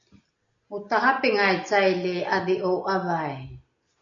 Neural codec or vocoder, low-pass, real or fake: none; 7.2 kHz; real